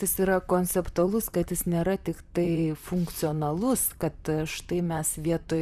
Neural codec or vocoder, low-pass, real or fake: vocoder, 44.1 kHz, 128 mel bands, Pupu-Vocoder; 14.4 kHz; fake